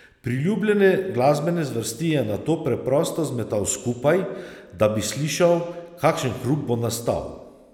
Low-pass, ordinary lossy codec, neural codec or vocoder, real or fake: 19.8 kHz; none; none; real